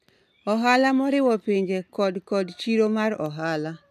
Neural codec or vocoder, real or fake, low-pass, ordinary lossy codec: none; real; 14.4 kHz; none